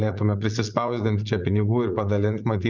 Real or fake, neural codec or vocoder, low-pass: fake; vocoder, 44.1 kHz, 80 mel bands, Vocos; 7.2 kHz